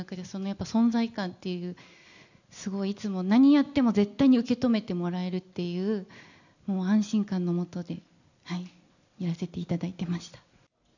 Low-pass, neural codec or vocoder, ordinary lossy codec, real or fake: 7.2 kHz; none; none; real